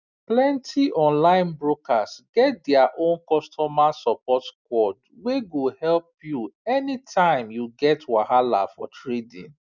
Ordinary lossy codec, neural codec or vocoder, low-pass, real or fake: none; none; 7.2 kHz; real